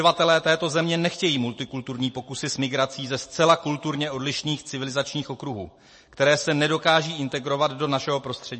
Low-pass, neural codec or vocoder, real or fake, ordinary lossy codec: 10.8 kHz; none; real; MP3, 32 kbps